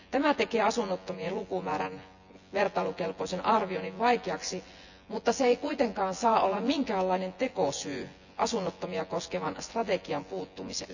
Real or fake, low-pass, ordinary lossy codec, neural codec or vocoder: fake; 7.2 kHz; none; vocoder, 24 kHz, 100 mel bands, Vocos